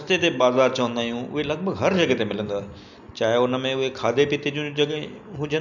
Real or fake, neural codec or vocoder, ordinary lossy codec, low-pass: real; none; none; 7.2 kHz